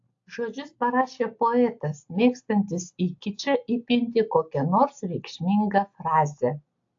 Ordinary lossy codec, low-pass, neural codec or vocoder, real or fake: AAC, 48 kbps; 7.2 kHz; none; real